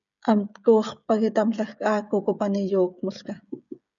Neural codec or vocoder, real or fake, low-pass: codec, 16 kHz, 16 kbps, FreqCodec, smaller model; fake; 7.2 kHz